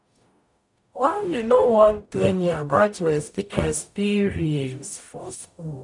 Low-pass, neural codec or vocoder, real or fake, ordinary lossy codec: 10.8 kHz; codec, 44.1 kHz, 0.9 kbps, DAC; fake; none